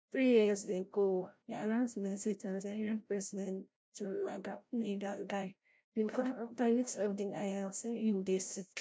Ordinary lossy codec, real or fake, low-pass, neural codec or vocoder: none; fake; none; codec, 16 kHz, 0.5 kbps, FreqCodec, larger model